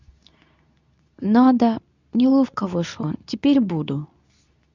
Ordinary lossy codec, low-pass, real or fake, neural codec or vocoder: none; 7.2 kHz; fake; codec, 24 kHz, 0.9 kbps, WavTokenizer, medium speech release version 2